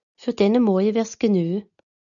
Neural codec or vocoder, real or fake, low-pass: none; real; 7.2 kHz